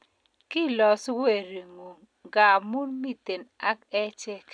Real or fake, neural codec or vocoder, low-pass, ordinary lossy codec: real; none; 9.9 kHz; none